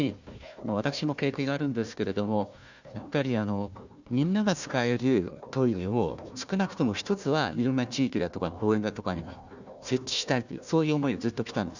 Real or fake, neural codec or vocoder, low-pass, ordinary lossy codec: fake; codec, 16 kHz, 1 kbps, FunCodec, trained on Chinese and English, 50 frames a second; 7.2 kHz; none